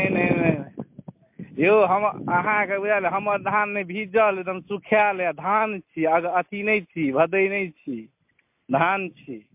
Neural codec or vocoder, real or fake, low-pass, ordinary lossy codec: none; real; 3.6 kHz; MP3, 32 kbps